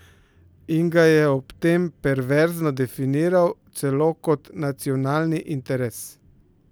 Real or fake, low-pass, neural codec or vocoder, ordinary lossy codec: real; none; none; none